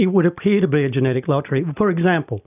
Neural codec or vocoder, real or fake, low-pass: none; real; 3.6 kHz